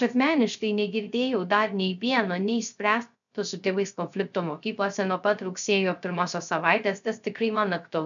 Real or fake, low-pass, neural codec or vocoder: fake; 7.2 kHz; codec, 16 kHz, 0.3 kbps, FocalCodec